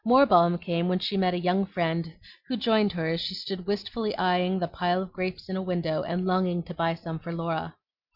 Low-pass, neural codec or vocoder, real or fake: 5.4 kHz; none; real